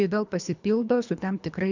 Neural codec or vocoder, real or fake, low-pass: codec, 24 kHz, 3 kbps, HILCodec; fake; 7.2 kHz